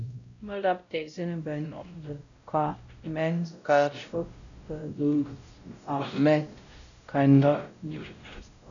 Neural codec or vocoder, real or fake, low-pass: codec, 16 kHz, 0.5 kbps, X-Codec, WavLM features, trained on Multilingual LibriSpeech; fake; 7.2 kHz